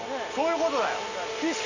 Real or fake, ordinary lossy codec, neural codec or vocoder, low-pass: real; none; none; 7.2 kHz